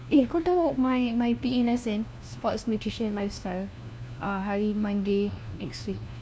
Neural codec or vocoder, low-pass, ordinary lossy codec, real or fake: codec, 16 kHz, 1 kbps, FunCodec, trained on LibriTTS, 50 frames a second; none; none; fake